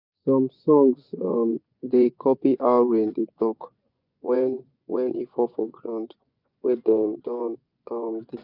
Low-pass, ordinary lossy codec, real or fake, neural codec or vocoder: 5.4 kHz; none; fake; vocoder, 24 kHz, 100 mel bands, Vocos